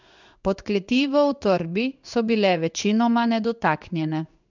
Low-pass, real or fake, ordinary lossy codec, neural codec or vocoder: 7.2 kHz; fake; none; codec, 16 kHz in and 24 kHz out, 1 kbps, XY-Tokenizer